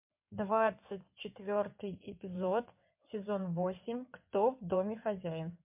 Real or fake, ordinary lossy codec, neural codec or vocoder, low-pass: fake; MP3, 32 kbps; codec, 16 kHz in and 24 kHz out, 2.2 kbps, FireRedTTS-2 codec; 3.6 kHz